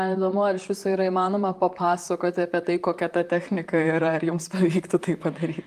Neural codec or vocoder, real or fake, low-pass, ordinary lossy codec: vocoder, 44.1 kHz, 128 mel bands, Pupu-Vocoder; fake; 14.4 kHz; Opus, 32 kbps